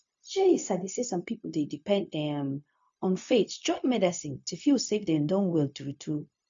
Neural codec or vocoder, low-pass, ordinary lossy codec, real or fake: codec, 16 kHz, 0.4 kbps, LongCat-Audio-Codec; 7.2 kHz; MP3, 48 kbps; fake